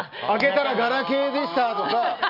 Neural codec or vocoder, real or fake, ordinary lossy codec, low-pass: none; real; none; 5.4 kHz